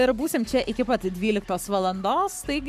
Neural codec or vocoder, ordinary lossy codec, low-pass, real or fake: codec, 44.1 kHz, 7.8 kbps, Pupu-Codec; MP3, 96 kbps; 14.4 kHz; fake